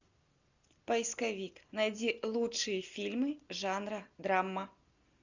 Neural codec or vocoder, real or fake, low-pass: none; real; 7.2 kHz